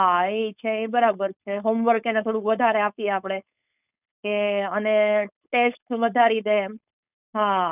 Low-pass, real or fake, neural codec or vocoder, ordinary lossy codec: 3.6 kHz; fake; codec, 16 kHz, 4.8 kbps, FACodec; none